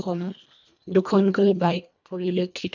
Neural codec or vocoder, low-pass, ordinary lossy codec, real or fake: codec, 24 kHz, 1.5 kbps, HILCodec; 7.2 kHz; none; fake